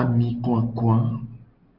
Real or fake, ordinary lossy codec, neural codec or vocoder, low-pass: real; Opus, 24 kbps; none; 5.4 kHz